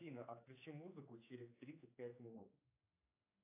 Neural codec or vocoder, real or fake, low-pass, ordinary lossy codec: codec, 16 kHz, 4 kbps, X-Codec, HuBERT features, trained on general audio; fake; 3.6 kHz; AAC, 24 kbps